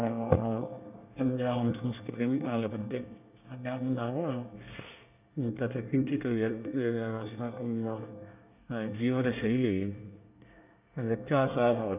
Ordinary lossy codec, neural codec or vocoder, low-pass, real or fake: none; codec, 24 kHz, 1 kbps, SNAC; 3.6 kHz; fake